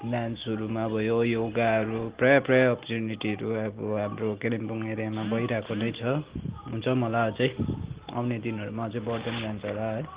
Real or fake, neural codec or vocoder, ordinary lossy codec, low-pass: real; none; Opus, 16 kbps; 3.6 kHz